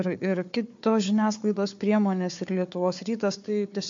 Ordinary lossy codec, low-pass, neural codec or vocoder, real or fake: MP3, 48 kbps; 7.2 kHz; codec, 16 kHz, 4 kbps, FunCodec, trained on Chinese and English, 50 frames a second; fake